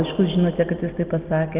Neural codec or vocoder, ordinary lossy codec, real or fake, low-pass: none; Opus, 24 kbps; real; 3.6 kHz